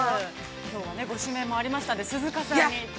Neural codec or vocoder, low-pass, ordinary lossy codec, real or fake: none; none; none; real